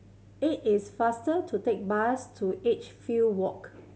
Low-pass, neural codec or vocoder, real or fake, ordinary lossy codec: none; none; real; none